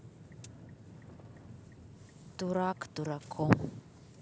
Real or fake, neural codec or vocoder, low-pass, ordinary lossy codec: real; none; none; none